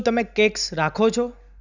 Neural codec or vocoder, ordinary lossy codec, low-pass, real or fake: none; none; 7.2 kHz; real